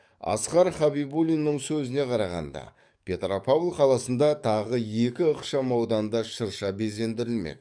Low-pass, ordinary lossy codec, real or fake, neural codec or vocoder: 9.9 kHz; none; fake; codec, 44.1 kHz, 7.8 kbps, DAC